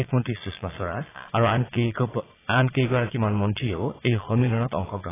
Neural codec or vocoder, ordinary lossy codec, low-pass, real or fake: vocoder, 22.05 kHz, 80 mel bands, Vocos; AAC, 16 kbps; 3.6 kHz; fake